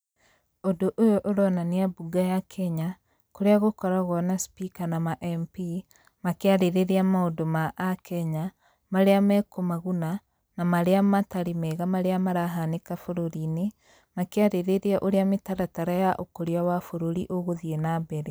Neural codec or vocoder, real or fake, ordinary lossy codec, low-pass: none; real; none; none